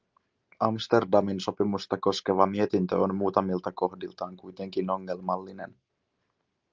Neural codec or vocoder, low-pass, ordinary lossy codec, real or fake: none; 7.2 kHz; Opus, 24 kbps; real